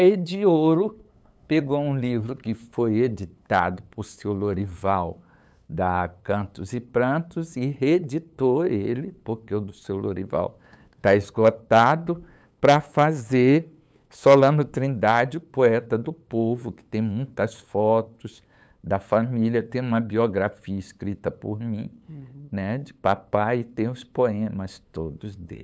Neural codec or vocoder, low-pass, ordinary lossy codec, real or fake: codec, 16 kHz, 8 kbps, FunCodec, trained on LibriTTS, 25 frames a second; none; none; fake